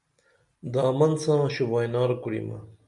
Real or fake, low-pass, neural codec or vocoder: real; 10.8 kHz; none